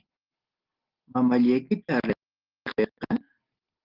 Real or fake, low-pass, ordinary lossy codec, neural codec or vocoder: real; 5.4 kHz; Opus, 16 kbps; none